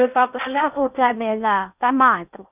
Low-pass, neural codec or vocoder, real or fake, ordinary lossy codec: 3.6 kHz; codec, 16 kHz in and 24 kHz out, 0.6 kbps, FocalCodec, streaming, 4096 codes; fake; none